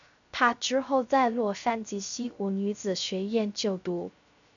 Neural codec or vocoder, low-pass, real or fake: codec, 16 kHz, 0.3 kbps, FocalCodec; 7.2 kHz; fake